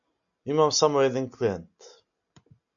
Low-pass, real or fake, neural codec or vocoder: 7.2 kHz; real; none